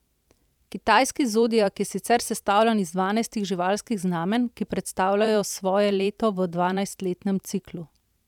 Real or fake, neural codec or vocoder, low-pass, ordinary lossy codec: fake; vocoder, 44.1 kHz, 128 mel bands every 512 samples, BigVGAN v2; 19.8 kHz; none